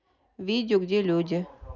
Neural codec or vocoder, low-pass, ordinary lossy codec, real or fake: none; 7.2 kHz; none; real